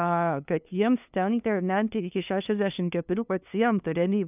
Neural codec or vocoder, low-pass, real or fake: codec, 16 kHz, 2 kbps, FunCodec, trained on LibriTTS, 25 frames a second; 3.6 kHz; fake